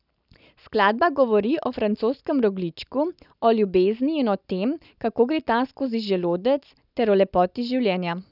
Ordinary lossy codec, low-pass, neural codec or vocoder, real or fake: none; 5.4 kHz; none; real